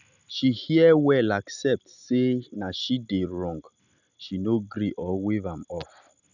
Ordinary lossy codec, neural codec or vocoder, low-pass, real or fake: none; none; 7.2 kHz; real